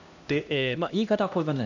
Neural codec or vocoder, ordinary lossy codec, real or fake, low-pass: codec, 16 kHz, 1 kbps, X-Codec, HuBERT features, trained on LibriSpeech; none; fake; 7.2 kHz